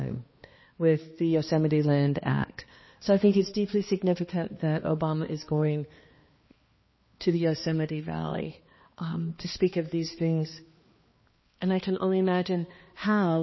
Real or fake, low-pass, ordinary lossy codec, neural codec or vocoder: fake; 7.2 kHz; MP3, 24 kbps; codec, 16 kHz, 2 kbps, X-Codec, HuBERT features, trained on balanced general audio